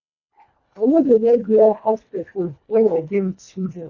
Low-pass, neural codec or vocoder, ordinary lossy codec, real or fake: 7.2 kHz; codec, 24 kHz, 1.5 kbps, HILCodec; none; fake